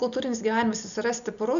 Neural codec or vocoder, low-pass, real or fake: none; 7.2 kHz; real